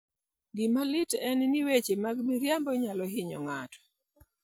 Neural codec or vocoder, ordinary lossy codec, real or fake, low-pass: none; none; real; none